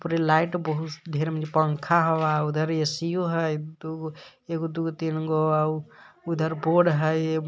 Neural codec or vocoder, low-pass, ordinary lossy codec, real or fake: none; none; none; real